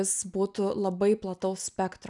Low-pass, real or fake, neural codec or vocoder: 10.8 kHz; real; none